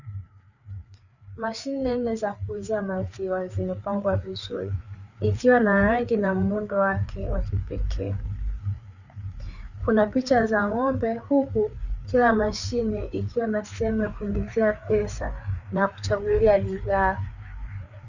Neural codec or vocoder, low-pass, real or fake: codec, 16 kHz, 4 kbps, FreqCodec, larger model; 7.2 kHz; fake